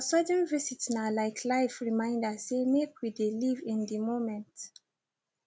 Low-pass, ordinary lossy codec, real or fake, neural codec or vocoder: none; none; real; none